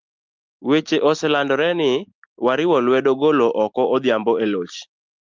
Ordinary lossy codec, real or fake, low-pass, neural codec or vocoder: Opus, 24 kbps; real; 7.2 kHz; none